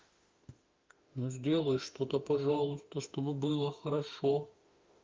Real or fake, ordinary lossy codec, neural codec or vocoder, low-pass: fake; Opus, 32 kbps; autoencoder, 48 kHz, 32 numbers a frame, DAC-VAE, trained on Japanese speech; 7.2 kHz